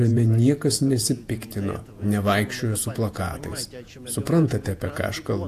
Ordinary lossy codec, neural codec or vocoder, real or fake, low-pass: AAC, 64 kbps; vocoder, 48 kHz, 128 mel bands, Vocos; fake; 14.4 kHz